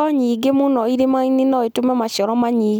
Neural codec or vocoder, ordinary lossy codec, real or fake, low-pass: none; none; real; none